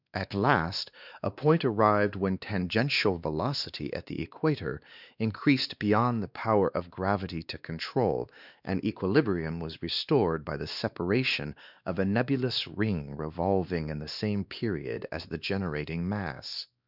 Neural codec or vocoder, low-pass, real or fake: codec, 16 kHz, 2 kbps, X-Codec, WavLM features, trained on Multilingual LibriSpeech; 5.4 kHz; fake